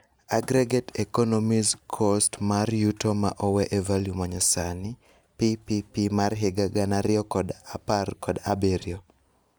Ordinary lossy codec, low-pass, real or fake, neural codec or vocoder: none; none; real; none